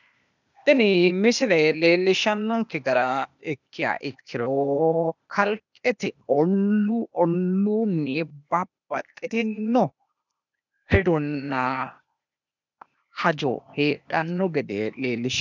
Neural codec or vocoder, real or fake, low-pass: codec, 16 kHz, 0.8 kbps, ZipCodec; fake; 7.2 kHz